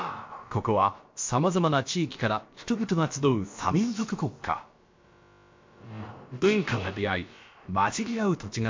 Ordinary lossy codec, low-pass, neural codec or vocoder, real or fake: MP3, 48 kbps; 7.2 kHz; codec, 16 kHz, about 1 kbps, DyCAST, with the encoder's durations; fake